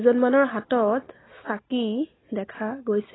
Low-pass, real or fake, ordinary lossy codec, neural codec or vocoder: 7.2 kHz; real; AAC, 16 kbps; none